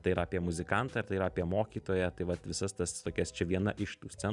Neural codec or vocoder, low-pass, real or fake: none; 10.8 kHz; real